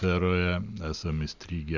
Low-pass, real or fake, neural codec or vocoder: 7.2 kHz; real; none